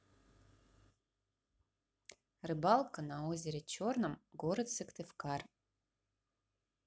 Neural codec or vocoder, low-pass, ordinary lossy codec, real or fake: none; none; none; real